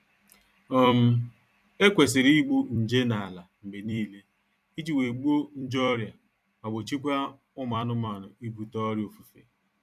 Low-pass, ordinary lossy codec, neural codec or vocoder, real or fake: 14.4 kHz; none; vocoder, 44.1 kHz, 128 mel bands every 256 samples, BigVGAN v2; fake